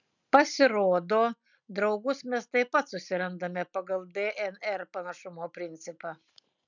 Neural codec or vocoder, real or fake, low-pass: none; real; 7.2 kHz